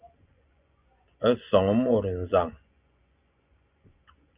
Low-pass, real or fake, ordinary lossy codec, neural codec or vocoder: 3.6 kHz; fake; Opus, 64 kbps; vocoder, 24 kHz, 100 mel bands, Vocos